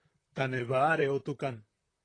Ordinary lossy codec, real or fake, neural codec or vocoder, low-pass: AAC, 32 kbps; fake; vocoder, 44.1 kHz, 128 mel bands, Pupu-Vocoder; 9.9 kHz